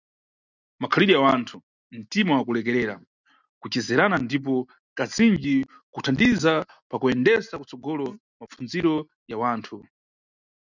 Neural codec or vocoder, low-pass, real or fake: none; 7.2 kHz; real